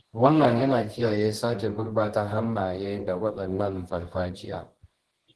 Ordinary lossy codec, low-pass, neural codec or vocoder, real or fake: Opus, 16 kbps; 10.8 kHz; codec, 24 kHz, 0.9 kbps, WavTokenizer, medium music audio release; fake